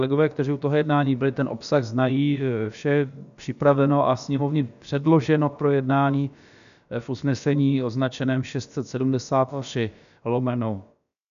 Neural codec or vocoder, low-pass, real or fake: codec, 16 kHz, about 1 kbps, DyCAST, with the encoder's durations; 7.2 kHz; fake